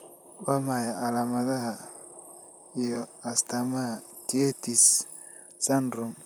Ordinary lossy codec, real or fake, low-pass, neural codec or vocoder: none; fake; none; vocoder, 44.1 kHz, 128 mel bands, Pupu-Vocoder